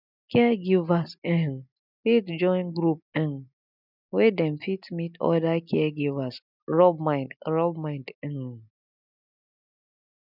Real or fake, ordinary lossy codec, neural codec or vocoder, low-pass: real; none; none; 5.4 kHz